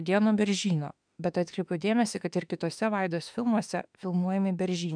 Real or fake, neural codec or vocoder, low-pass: fake; autoencoder, 48 kHz, 32 numbers a frame, DAC-VAE, trained on Japanese speech; 9.9 kHz